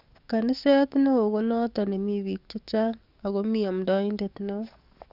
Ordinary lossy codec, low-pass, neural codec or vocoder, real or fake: none; 5.4 kHz; codec, 44.1 kHz, 7.8 kbps, DAC; fake